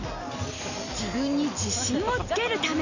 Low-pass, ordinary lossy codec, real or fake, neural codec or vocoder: 7.2 kHz; none; real; none